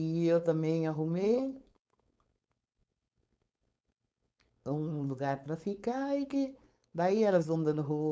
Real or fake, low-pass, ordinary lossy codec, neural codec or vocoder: fake; none; none; codec, 16 kHz, 4.8 kbps, FACodec